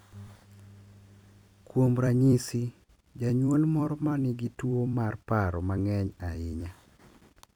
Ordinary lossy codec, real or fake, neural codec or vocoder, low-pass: none; fake; vocoder, 44.1 kHz, 128 mel bands every 256 samples, BigVGAN v2; 19.8 kHz